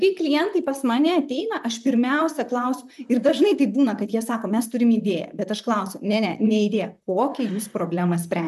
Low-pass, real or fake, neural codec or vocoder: 14.4 kHz; fake; vocoder, 44.1 kHz, 128 mel bands, Pupu-Vocoder